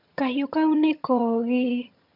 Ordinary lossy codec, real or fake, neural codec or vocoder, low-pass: MP3, 48 kbps; fake; vocoder, 22.05 kHz, 80 mel bands, HiFi-GAN; 5.4 kHz